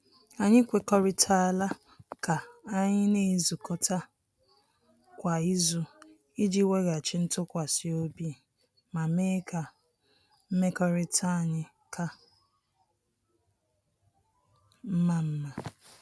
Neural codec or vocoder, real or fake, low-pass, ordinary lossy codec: none; real; none; none